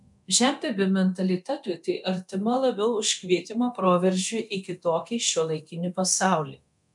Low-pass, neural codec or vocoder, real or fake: 10.8 kHz; codec, 24 kHz, 0.9 kbps, DualCodec; fake